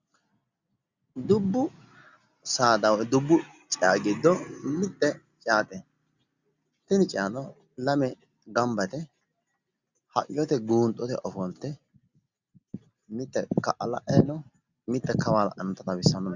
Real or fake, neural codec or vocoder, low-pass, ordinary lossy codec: real; none; 7.2 kHz; Opus, 64 kbps